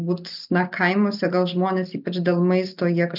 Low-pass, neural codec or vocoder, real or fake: 5.4 kHz; none; real